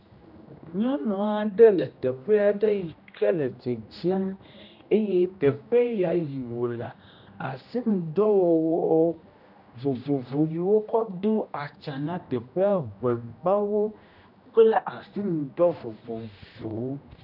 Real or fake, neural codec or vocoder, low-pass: fake; codec, 16 kHz, 1 kbps, X-Codec, HuBERT features, trained on general audio; 5.4 kHz